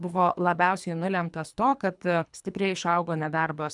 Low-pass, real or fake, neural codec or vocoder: 10.8 kHz; fake; codec, 24 kHz, 3 kbps, HILCodec